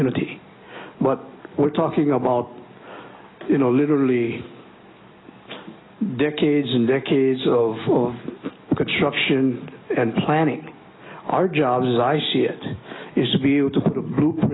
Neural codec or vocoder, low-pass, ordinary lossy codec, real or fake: none; 7.2 kHz; AAC, 16 kbps; real